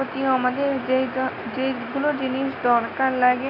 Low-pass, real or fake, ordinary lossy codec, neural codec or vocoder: 5.4 kHz; real; none; none